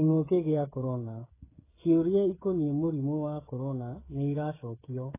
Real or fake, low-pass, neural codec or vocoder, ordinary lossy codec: fake; 3.6 kHz; codec, 16 kHz, 8 kbps, FreqCodec, smaller model; AAC, 16 kbps